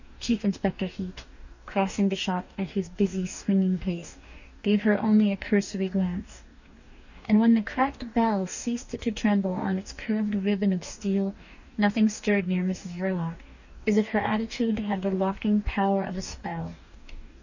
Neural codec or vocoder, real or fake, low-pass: codec, 44.1 kHz, 2.6 kbps, DAC; fake; 7.2 kHz